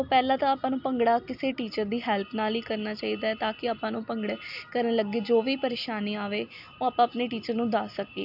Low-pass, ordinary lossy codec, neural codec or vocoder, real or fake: 5.4 kHz; AAC, 48 kbps; none; real